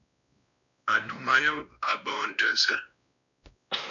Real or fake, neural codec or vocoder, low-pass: fake; codec, 16 kHz, 1 kbps, X-Codec, WavLM features, trained on Multilingual LibriSpeech; 7.2 kHz